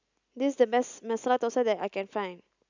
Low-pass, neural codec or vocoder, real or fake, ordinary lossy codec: 7.2 kHz; none; real; none